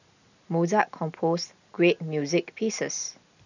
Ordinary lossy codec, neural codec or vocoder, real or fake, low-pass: none; none; real; 7.2 kHz